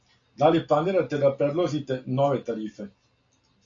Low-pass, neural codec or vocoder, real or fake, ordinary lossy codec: 7.2 kHz; none; real; AAC, 48 kbps